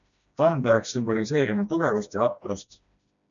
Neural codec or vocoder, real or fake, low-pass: codec, 16 kHz, 1 kbps, FreqCodec, smaller model; fake; 7.2 kHz